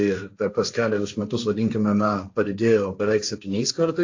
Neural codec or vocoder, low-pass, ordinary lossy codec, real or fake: codec, 16 kHz, 1.1 kbps, Voila-Tokenizer; 7.2 kHz; AAC, 48 kbps; fake